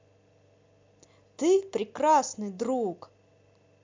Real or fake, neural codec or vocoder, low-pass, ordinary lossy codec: real; none; 7.2 kHz; MP3, 64 kbps